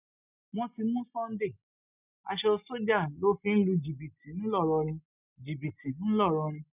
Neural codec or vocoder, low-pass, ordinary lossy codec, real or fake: none; 3.6 kHz; none; real